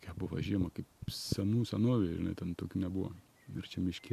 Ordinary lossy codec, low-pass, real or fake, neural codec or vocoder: MP3, 64 kbps; 14.4 kHz; real; none